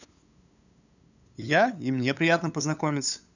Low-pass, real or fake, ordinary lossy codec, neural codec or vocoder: 7.2 kHz; fake; none; codec, 16 kHz, 8 kbps, FunCodec, trained on LibriTTS, 25 frames a second